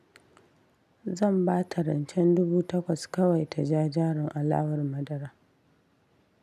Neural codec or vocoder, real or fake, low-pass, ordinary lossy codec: none; real; 14.4 kHz; none